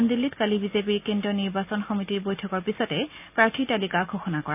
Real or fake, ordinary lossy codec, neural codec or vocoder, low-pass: real; none; none; 3.6 kHz